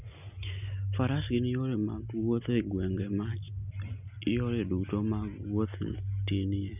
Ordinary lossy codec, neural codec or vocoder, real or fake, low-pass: none; none; real; 3.6 kHz